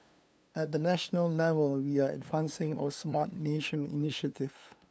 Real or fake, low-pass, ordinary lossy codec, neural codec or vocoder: fake; none; none; codec, 16 kHz, 2 kbps, FunCodec, trained on LibriTTS, 25 frames a second